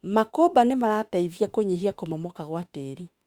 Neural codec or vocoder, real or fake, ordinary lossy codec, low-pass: autoencoder, 48 kHz, 32 numbers a frame, DAC-VAE, trained on Japanese speech; fake; Opus, 64 kbps; 19.8 kHz